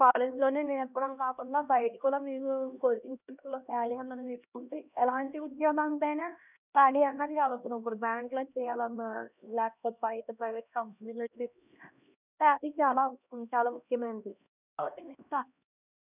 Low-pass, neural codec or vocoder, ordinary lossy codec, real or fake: 3.6 kHz; codec, 16 kHz, 1 kbps, X-Codec, HuBERT features, trained on LibriSpeech; none; fake